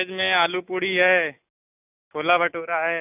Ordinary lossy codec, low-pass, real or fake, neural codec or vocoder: none; 3.6 kHz; real; none